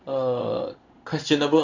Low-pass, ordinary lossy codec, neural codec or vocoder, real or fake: 7.2 kHz; Opus, 64 kbps; codec, 16 kHz in and 24 kHz out, 1 kbps, XY-Tokenizer; fake